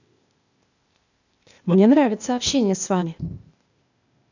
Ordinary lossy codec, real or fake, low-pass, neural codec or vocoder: none; fake; 7.2 kHz; codec, 16 kHz, 0.8 kbps, ZipCodec